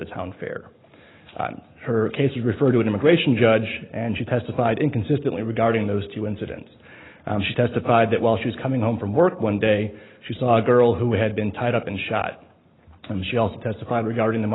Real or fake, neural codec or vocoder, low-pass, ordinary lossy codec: real; none; 7.2 kHz; AAC, 16 kbps